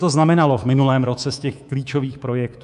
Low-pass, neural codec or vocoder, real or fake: 10.8 kHz; codec, 24 kHz, 3.1 kbps, DualCodec; fake